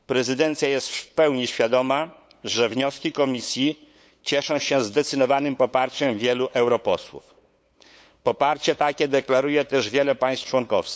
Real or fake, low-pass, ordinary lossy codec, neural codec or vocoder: fake; none; none; codec, 16 kHz, 8 kbps, FunCodec, trained on LibriTTS, 25 frames a second